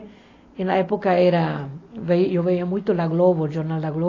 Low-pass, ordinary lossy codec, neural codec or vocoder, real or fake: 7.2 kHz; AAC, 32 kbps; none; real